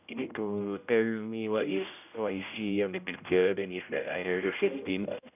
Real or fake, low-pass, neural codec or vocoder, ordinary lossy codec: fake; 3.6 kHz; codec, 16 kHz, 0.5 kbps, X-Codec, HuBERT features, trained on general audio; none